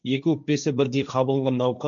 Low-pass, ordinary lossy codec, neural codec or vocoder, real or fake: 7.2 kHz; none; codec, 16 kHz, 1.1 kbps, Voila-Tokenizer; fake